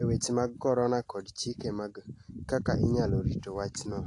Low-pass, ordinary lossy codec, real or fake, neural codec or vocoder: 10.8 kHz; AAC, 48 kbps; real; none